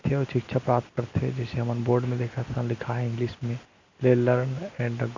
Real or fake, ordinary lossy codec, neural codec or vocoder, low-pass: real; MP3, 64 kbps; none; 7.2 kHz